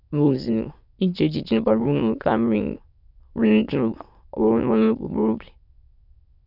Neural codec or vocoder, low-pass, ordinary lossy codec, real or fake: autoencoder, 22.05 kHz, a latent of 192 numbers a frame, VITS, trained on many speakers; 5.4 kHz; none; fake